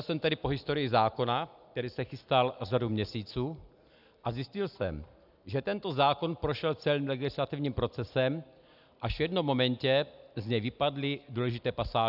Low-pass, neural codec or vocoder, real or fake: 5.4 kHz; none; real